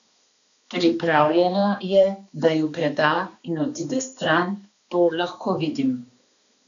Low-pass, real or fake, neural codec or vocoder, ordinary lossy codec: 7.2 kHz; fake; codec, 16 kHz, 2 kbps, X-Codec, HuBERT features, trained on balanced general audio; none